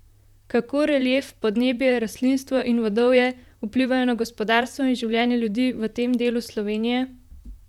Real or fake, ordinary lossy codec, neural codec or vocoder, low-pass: fake; none; vocoder, 44.1 kHz, 128 mel bands, Pupu-Vocoder; 19.8 kHz